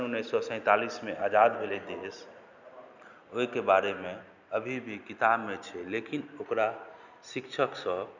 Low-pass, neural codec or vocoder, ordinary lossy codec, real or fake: 7.2 kHz; none; none; real